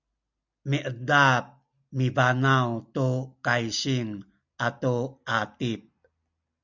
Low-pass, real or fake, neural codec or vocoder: 7.2 kHz; real; none